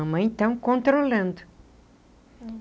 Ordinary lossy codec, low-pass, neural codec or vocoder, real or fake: none; none; none; real